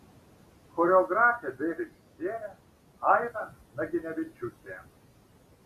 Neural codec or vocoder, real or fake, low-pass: none; real; 14.4 kHz